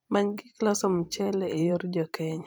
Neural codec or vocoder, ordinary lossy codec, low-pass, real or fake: vocoder, 44.1 kHz, 128 mel bands every 512 samples, BigVGAN v2; none; none; fake